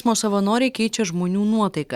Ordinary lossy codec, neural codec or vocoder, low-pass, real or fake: Opus, 64 kbps; none; 19.8 kHz; real